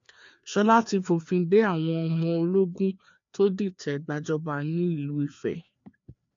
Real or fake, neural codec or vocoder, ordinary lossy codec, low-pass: fake; codec, 16 kHz, 2 kbps, FreqCodec, larger model; MP3, 64 kbps; 7.2 kHz